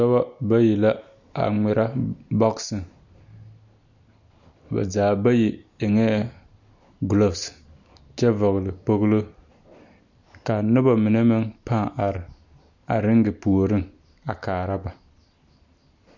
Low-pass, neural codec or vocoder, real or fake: 7.2 kHz; none; real